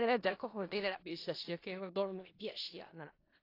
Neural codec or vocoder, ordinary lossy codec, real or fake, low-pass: codec, 16 kHz in and 24 kHz out, 0.4 kbps, LongCat-Audio-Codec, four codebook decoder; AAC, 32 kbps; fake; 5.4 kHz